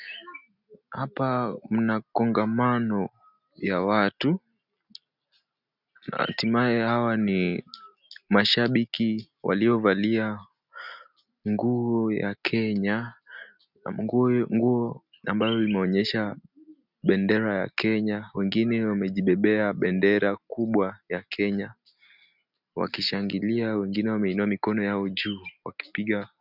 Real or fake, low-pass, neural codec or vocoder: real; 5.4 kHz; none